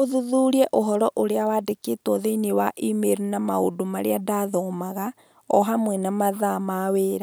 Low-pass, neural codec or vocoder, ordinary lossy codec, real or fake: none; none; none; real